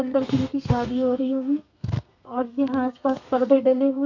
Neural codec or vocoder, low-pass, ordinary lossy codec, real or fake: codec, 32 kHz, 1.9 kbps, SNAC; 7.2 kHz; none; fake